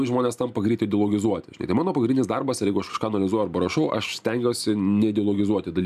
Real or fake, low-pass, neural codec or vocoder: real; 14.4 kHz; none